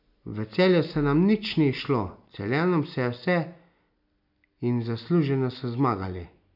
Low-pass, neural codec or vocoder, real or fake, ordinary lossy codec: 5.4 kHz; none; real; none